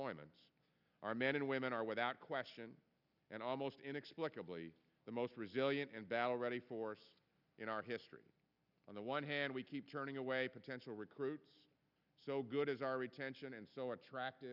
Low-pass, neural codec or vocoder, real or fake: 5.4 kHz; none; real